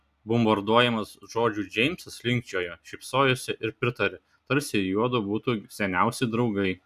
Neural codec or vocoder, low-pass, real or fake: none; 14.4 kHz; real